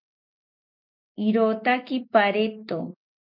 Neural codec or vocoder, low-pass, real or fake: none; 5.4 kHz; real